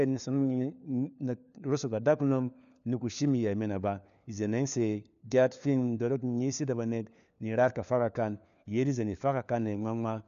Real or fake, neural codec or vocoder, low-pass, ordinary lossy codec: fake; codec, 16 kHz, 4 kbps, FunCodec, trained on LibriTTS, 50 frames a second; 7.2 kHz; none